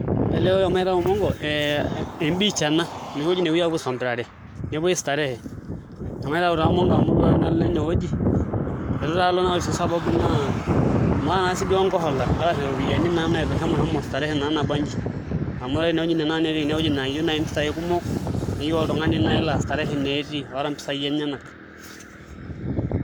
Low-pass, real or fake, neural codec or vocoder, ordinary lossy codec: none; fake; codec, 44.1 kHz, 7.8 kbps, Pupu-Codec; none